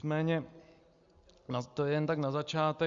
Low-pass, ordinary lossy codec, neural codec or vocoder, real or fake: 7.2 kHz; Opus, 64 kbps; none; real